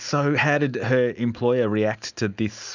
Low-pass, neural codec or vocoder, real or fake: 7.2 kHz; none; real